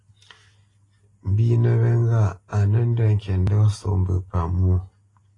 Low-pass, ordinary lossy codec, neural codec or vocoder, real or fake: 10.8 kHz; AAC, 32 kbps; none; real